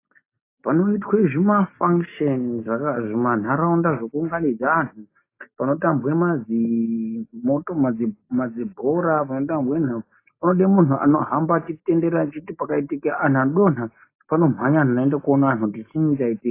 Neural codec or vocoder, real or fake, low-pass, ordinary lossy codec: none; real; 3.6 kHz; AAC, 24 kbps